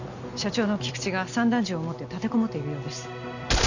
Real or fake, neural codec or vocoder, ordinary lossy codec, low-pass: real; none; none; 7.2 kHz